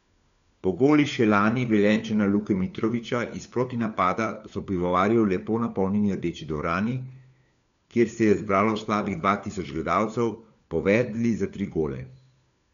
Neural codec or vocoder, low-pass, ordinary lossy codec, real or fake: codec, 16 kHz, 4 kbps, FunCodec, trained on LibriTTS, 50 frames a second; 7.2 kHz; none; fake